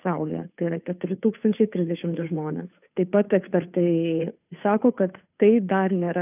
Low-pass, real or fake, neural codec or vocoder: 3.6 kHz; fake; codec, 16 kHz, 2 kbps, FunCodec, trained on Chinese and English, 25 frames a second